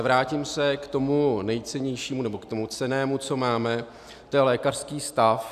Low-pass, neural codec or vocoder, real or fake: 14.4 kHz; none; real